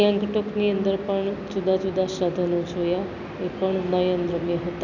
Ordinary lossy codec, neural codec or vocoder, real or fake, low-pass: none; none; real; 7.2 kHz